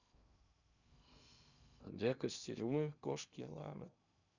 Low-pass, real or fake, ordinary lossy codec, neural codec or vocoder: 7.2 kHz; fake; none; codec, 16 kHz in and 24 kHz out, 0.6 kbps, FocalCodec, streaming, 2048 codes